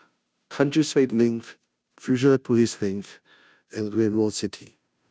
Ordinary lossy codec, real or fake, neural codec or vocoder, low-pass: none; fake; codec, 16 kHz, 0.5 kbps, FunCodec, trained on Chinese and English, 25 frames a second; none